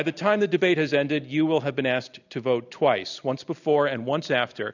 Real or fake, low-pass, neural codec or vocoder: real; 7.2 kHz; none